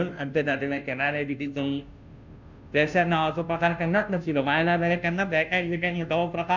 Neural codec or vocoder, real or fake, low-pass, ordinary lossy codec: codec, 16 kHz, 0.5 kbps, FunCodec, trained on Chinese and English, 25 frames a second; fake; 7.2 kHz; none